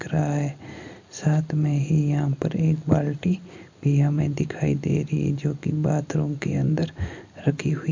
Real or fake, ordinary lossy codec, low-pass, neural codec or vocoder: real; MP3, 48 kbps; 7.2 kHz; none